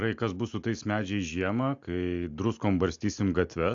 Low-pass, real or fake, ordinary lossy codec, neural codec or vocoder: 7.2 kHz; real; AAC, 64 kbps; none